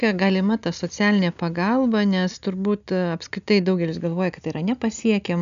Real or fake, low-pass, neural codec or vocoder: real; 7.2 kHz; none